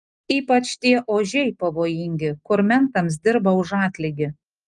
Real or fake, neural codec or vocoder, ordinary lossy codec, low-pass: real; none; Opus, 32 kbps; 10.8 kHz